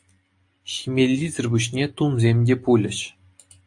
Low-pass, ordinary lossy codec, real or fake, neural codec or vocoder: 10.8 kHz; AAC, 64 kbps; real; none